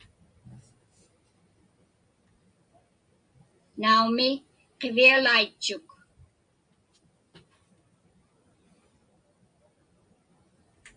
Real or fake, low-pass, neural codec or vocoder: real; 9.9 kHz; none